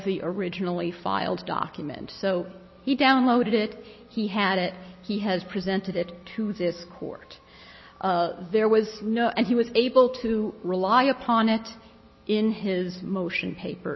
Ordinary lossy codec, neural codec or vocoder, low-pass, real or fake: MP3, 24 kbps; none; 7.2 kHz; real